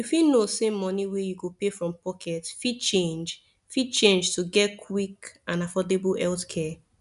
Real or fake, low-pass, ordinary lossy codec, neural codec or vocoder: real; 10.8 kHz; none; none